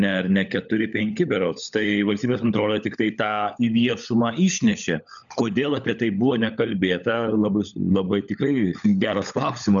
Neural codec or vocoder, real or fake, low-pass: codec, 16 kHz, 16 kbps, FunCodec, trained on LibriTTS, 50 frames a second; fake; 7.2 kHz